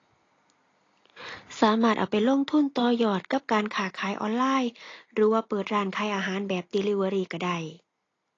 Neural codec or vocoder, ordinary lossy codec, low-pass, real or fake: none; AAC, 32 kbps; 7.2 kHz; real